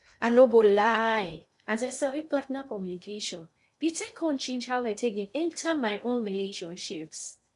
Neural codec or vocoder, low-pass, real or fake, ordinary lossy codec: codec, 16 kHz in and 24 kHz out, 0.6 kbps, FocalCodec, streaming, 2048 codes; 10.8 kHz; fake; none